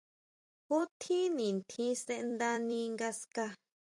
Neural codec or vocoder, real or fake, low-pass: vocoder, 44.1 kHz, 128 mel bands every 256 samples, BigVGAN v2; fake; 10.8 kHz